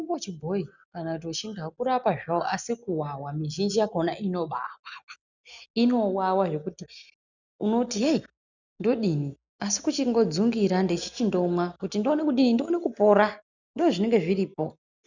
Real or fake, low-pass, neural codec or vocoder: real; 7.2 kHz; none